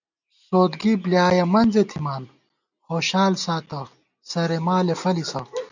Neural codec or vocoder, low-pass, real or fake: none; 7.2 kHz; real